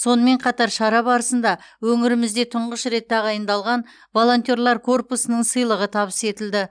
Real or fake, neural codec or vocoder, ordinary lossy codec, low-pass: real; none; none; 9.9 kHz